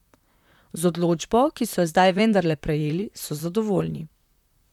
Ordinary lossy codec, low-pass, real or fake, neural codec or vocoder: none; 19.8 kHz; fake; vocoder, 44.1 kHz, 128 mel bands, Pupu-Vocoder